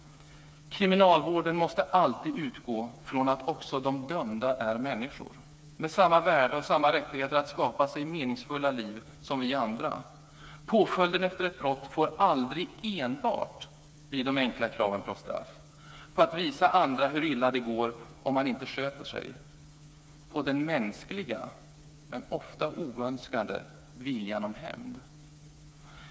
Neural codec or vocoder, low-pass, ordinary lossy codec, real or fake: codec, 16 kHz, 4 kbps, FreqCodec, smaller model; none; none; fake